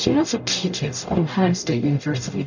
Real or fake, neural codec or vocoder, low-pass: fake; codec, 44.1 kHz, 0.9 kbps, DAC; 7.2 kHz